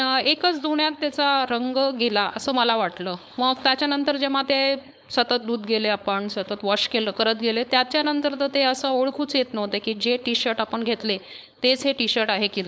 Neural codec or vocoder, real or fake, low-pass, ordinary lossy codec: codec, 16 kHz, 4.8 kbps, FACodec; fake; none; none